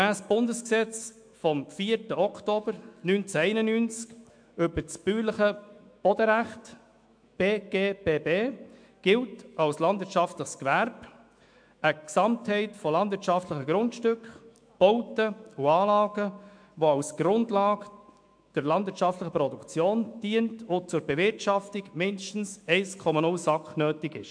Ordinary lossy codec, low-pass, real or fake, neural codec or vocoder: MP3, 64 kbps; 9.9 kHz; fake; autoencoder, 48 kHz, 128 numbers a frame, DAC-VAE, trained on Japanese speech